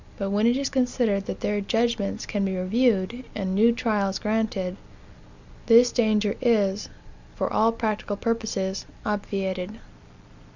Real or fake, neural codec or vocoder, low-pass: real; none; 7.2 kHz